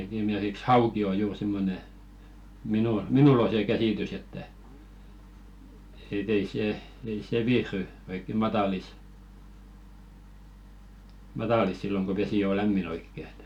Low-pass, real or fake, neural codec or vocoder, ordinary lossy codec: 19.8 kHz; real; none; none